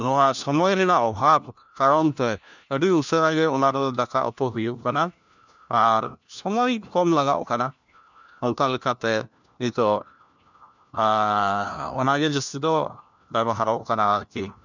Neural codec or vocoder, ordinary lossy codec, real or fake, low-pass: codec, 16 kHz, 1 kbps, FunCodec, trained on LibriTTS, 50 frames a second; none; fake; 7.2 kHz